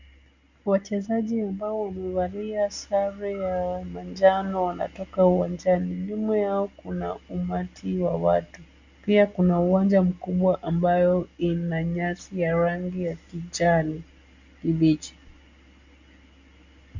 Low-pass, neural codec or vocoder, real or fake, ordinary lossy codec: 7.2 kHz; none; real; Opus, 64 kbps